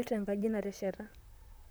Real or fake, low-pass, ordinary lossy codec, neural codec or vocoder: fake; none; none; vocoder, 44.1 kHz, 128 mel bands, Pupu-Vocoder